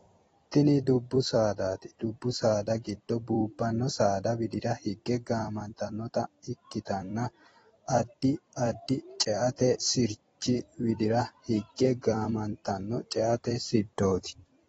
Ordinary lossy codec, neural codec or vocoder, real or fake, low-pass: AAC, 24 kbps; vocoder, 44.1 kHz, 128 mel bands every 256 samples, BigVGAN v2; fake; 19.8 kHz